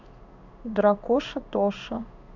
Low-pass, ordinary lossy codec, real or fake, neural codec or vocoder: 7.2 kHz; none; fake; codec, 16 kHz in and 24 kHz out, 1 kbps, XY-Tokenizer